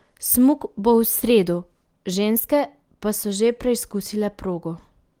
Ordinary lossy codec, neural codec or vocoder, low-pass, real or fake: Opus, 24 kbps; none; 19.8 kHz; real